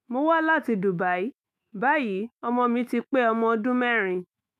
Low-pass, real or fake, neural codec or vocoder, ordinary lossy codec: 14.4 kHz; fake; autoencoder, 48 kHz, 128 numbers a frame, DAC-VAE, trained on Japanese speech; none